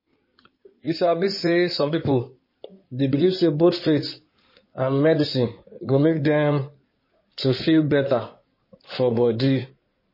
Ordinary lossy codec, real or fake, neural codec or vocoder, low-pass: MP3, 24 kbps; fake; codec, 16 kHz in and 24 kHz out, 2.2 kbps, FireRedTTS-2 codec; 5.4 kHz